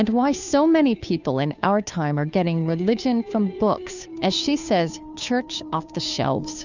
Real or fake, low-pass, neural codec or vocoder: fake; 7.2 kHz; codec, 16 kHz, 2 kbps, FunCodec, trained on Chinese and English, 25 frames a second